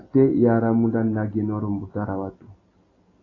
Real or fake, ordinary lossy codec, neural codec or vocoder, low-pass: real; AAC, 32 kbps; none; 7.2 kHz